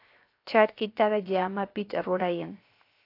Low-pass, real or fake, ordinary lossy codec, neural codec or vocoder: 5.4 kHz; fake; AAC, 32 kbps; codec, 16 kHz, 0.7 kbps, FocalCodec